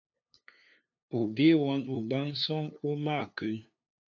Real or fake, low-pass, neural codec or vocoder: fake; 7.2 kHz; codec, 16 kHz, 2 kbps, FunCodec, trained on LibriTTS, 25 frames a second